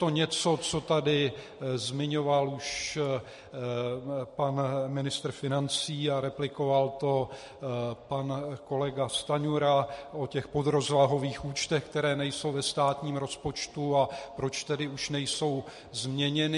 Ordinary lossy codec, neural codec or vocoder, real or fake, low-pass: MP3, 48 kbps; none; real; 14.4 kHz